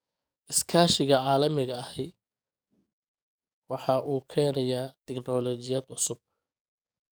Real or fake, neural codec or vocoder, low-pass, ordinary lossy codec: fake; codec, 44.1 kHz, 7.8 kbps, DAC; none; none